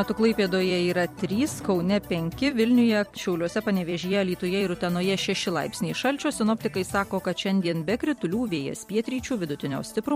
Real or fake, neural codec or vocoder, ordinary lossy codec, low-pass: fake; vocoder, 44.1 kHz, 128 mel bands every 256 samples, BigVGAN v2; MP3, 64 kbps; 19.8 kHz